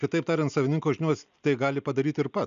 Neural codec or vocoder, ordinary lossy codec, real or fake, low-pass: none; AAC, 96 kbps; real; 7.2 kHz